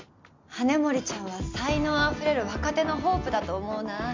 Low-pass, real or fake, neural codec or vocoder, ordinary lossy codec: 7.2 kHz; real; none; MP3, 64 kbps